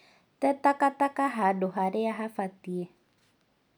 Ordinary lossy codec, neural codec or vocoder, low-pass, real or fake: none; none; 19.8 kHz; real